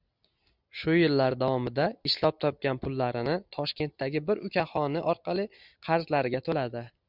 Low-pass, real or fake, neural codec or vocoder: 5.4 kHz; real; none